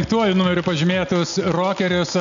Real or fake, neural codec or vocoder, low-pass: real; none; 7.2 kHz